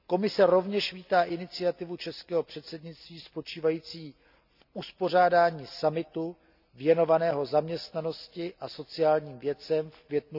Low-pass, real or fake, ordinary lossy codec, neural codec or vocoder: 5.4 kHz; real; none; none